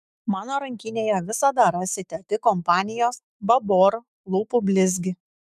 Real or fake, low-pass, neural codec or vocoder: fake; 14.4 kHz; autoencoder, 48 kHz, 128 numbers a frame, DAC-VAE, trained on Japanese speech